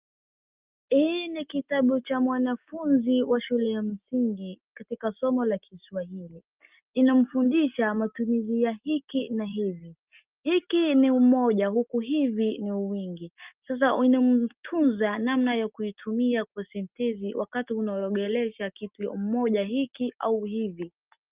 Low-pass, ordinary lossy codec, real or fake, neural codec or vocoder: 3.6 kHz; Opus, 24 kbps; real; none